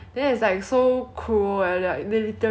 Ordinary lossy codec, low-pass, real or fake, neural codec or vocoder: none; none; real; none